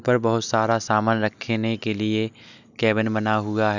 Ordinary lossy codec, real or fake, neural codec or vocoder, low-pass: none; real; none; 7.2 kHz